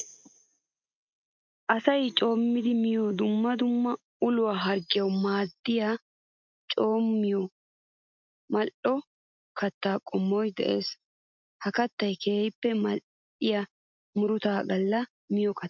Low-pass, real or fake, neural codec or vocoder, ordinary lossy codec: 7.2 kHz; real; none; MP3, 64 kbps